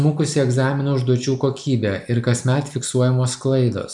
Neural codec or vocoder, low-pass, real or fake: none; 10.8 kHz; real